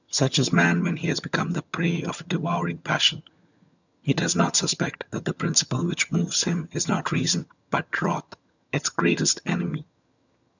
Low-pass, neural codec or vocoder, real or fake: 7.2 kHz; vocoder, 22.05 kHz, 80 mel bands, HiFi-GAN; fake